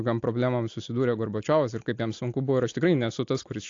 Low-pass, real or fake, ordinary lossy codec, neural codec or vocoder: 7.2 kHz; real; AAC, 48 kbps; none